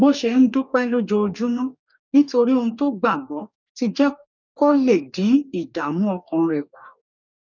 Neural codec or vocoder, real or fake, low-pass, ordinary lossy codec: codec, 44.1 kHz, 2.6 kbps, DAC; fake; 7.2 kHz; none